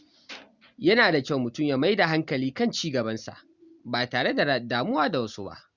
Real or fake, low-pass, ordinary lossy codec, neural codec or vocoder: real; 7.2 kHz; Opus, 64 kbps; none